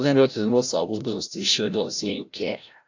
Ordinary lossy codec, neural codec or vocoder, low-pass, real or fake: AAC, 48 kbps; codec, 16 kHz, 0.5 kbps, FreqCodec, larger model; 7.2 kHz; fake